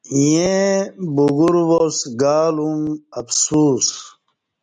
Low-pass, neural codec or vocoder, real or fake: 9.9 kHz; none; real